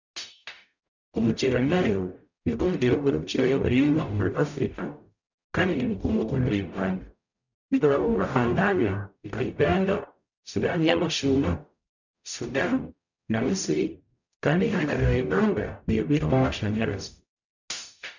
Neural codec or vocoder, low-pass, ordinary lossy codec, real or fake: codec, 44.1 kHz, 0.9 kbps, DAC; 7.2 kHz; none; fake